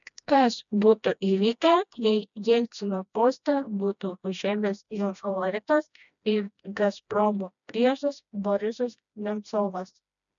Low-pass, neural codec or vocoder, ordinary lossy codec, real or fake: 7.2 kHz; codec, 16 kHz, 1 kbps, FreqCodec, smaller model; MP3, 96 kbps; fake